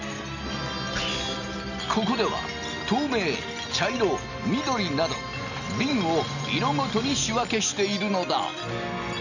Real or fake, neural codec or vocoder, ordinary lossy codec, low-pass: real; none; none; 7.2 kHz